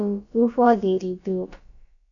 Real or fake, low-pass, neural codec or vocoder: fake; 7.2 kHz; codec, 16 kHz, about 1 kbps, DyCAST, with the encoder's durations